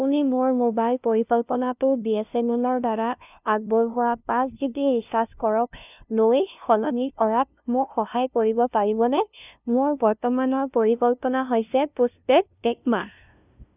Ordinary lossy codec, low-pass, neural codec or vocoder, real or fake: none; 3.6 kHz; codec, 16 kHz, 0.5 kbps, FunCodec, trained on LibriTTS, 25 frames a second; fake